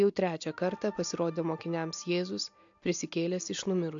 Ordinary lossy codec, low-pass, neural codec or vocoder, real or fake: AAC, 64 kbps; 7.2 kHz; none; real